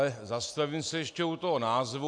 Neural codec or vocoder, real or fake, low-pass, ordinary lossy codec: none; real; 9.9 kHz; AAC, 64 kbps